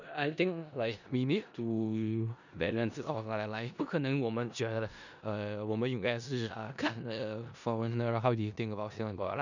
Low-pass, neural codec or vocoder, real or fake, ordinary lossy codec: 7.2 kHz; codec, 16 kHz in and 24 kHz out, 0.4 kbps, LongCat-Audio-Codec, four codebook decoder; fake; none